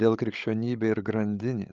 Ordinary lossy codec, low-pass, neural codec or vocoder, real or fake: Opus, 24 kbps; 7.2 kHz; codec, 16 kHz, 16 kbps, FreqCodec, larger model; fake